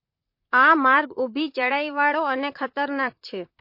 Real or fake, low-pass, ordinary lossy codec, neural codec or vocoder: fake; 5.4 kHz; MP3, 32 kbps; vocoder, 44.1 kHz, 128 mel bands, Pupu-Vocoder